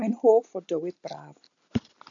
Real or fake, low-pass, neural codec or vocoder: real; 7.2 kHz; none